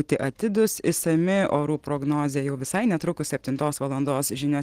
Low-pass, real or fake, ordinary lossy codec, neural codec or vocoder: 14.4 kHz; real; Opus, 16 kbps; none